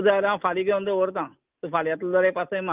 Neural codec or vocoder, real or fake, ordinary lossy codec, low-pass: none; real; Opus, 24 kbps; 3.6 kHz